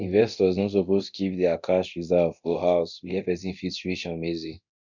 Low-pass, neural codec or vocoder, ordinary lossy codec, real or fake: 7.2 kHz; codec, 24 kHz, 0.9 kbps, DualCodec; none; fake